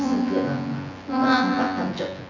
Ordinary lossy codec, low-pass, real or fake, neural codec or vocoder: none; 7.2 kHz; fake; vocoder, 24 kHz, 100 mel bands, Vocos